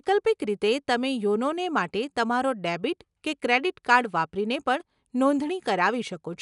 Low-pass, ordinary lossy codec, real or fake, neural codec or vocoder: 10.8 kHz; none; real; none